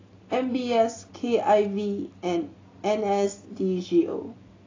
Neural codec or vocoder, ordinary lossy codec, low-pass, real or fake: none; AAC, 32 kbps; 7.2 kHz; real